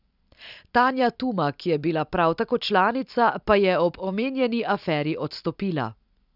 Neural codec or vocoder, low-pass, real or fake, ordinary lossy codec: none; 5.4 kHz; real; none